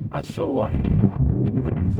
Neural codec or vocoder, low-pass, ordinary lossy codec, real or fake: codec, 44.1 kHz, 0.9 kbps, DAC; 19.8 kHz; none; fake